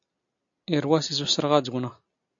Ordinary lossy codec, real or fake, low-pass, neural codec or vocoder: AAC, 64 kbps; real; 7.2 kHz; none